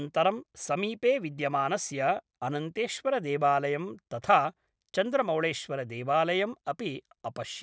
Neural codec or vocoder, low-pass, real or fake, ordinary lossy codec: none; none; real; none